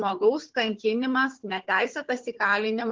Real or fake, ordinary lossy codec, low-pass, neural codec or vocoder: fake; Opus, 16 kbps; 7.2 kHz; codec, 16 kHz, 4 kbps, FunCodec, trained on Chinese and English, 50 frames a second